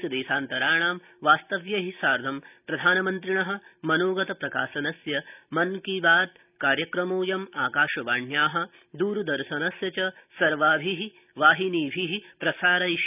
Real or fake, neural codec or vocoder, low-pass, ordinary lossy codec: real; none; 3.6 kHz; none